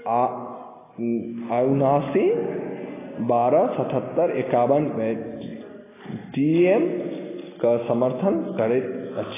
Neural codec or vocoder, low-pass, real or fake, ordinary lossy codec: none; 3.6 kHz; real; AAC, 16 kbps